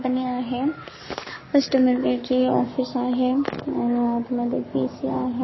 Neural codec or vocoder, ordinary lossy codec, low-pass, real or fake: codec, 44.1 kHz, 7.8 kbps, Pupu-Codec; MP3, 24 kbps; 7.2 kHz; fake